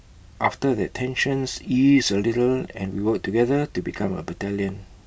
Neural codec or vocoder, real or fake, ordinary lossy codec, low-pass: none; real; none; none